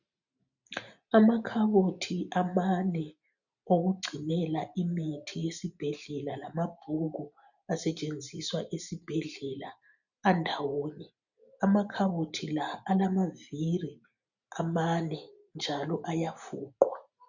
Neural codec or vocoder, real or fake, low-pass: none; real; 7.2 kHz